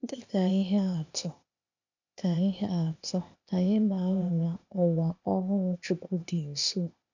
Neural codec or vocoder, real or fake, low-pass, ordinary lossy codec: codec, 16 kHz, 0.8 kbps, ZipCodec; fake; 7.2 kHz; none